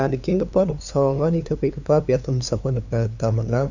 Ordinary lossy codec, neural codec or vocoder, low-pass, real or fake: none; codec, 16 kHz, 2 kbps, FunCodec, trained on LibriTTS, 25 frames a second; 7.2 kHz; fake